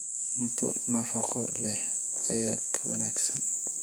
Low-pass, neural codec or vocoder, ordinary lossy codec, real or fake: none; codec, 44.1 kHz, 2.6 kbps, SNAC; none; fake